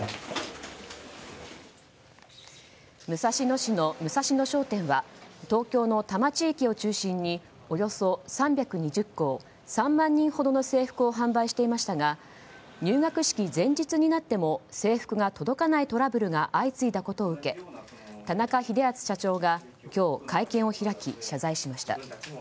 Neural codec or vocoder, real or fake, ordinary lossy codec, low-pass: none; real; none; none